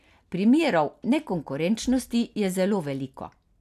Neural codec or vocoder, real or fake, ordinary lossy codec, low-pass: none; real; none; 14.4 kHz